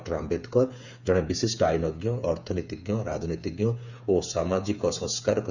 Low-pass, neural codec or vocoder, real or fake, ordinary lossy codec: 7.2 kHz; codec, 16 kHz, 8 kbps, FreqCodec, smaller model; fake; none